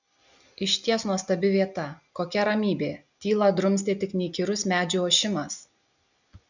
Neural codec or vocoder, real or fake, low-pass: none; real; 7.2 kHz